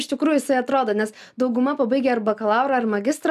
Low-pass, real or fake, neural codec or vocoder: 14.4 kHz; real; none